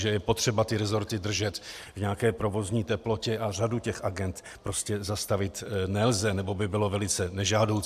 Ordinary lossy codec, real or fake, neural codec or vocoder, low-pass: AAC, 96 kbps; real; none; 14.4 kHz